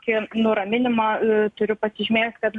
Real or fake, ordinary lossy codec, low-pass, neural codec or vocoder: real; MP3, 64 kbps; 10.8 kHz; none